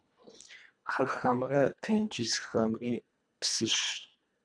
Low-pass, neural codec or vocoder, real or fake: 9.9 kHz; codec, 24 kHz, 1.5 kbps, HILCodec; fake